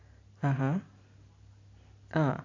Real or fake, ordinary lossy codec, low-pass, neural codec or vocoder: real; AAC, 32 kbps; 7.2 kHz; none